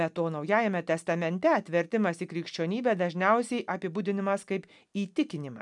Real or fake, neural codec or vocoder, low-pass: real; none; 10.8 kHz